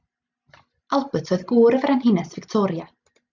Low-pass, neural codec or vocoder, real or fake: 7.2 kHz; none; real